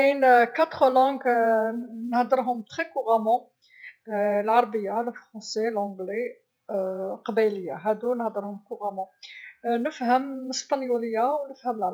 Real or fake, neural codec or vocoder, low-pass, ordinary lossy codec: fake; vocoder, 48 kHz, 128 mel bands, Vocos; none; none